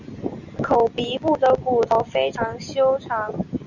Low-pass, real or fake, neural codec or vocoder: 7.2 kHz; real; none